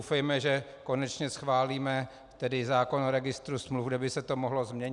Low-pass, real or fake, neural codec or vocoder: 10.8 kHz; real; none